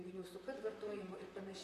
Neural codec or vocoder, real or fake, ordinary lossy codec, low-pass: vocoder, 44.1 kHz, 128 mel bands, Pupu-Vocoder; fake; AAC, 48 kbps; 14.4 kHz